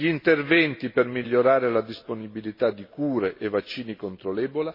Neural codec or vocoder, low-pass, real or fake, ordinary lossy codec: none; 5.4 kHz; real; MP3, 24 kbps